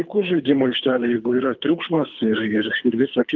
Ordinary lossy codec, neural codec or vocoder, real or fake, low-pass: Opus, 24 kbps; codec, 24 kHz, 3 kbps, HILCodec; fake; 7.2 kHz